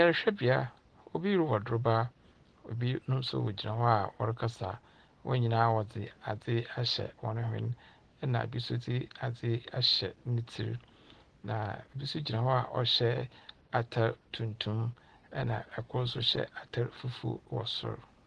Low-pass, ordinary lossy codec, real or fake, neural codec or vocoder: 10.8 kHz; Opus, 16 kbps; real; none